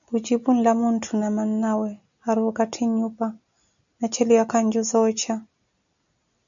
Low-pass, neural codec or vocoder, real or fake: 7.2 kHz; none; real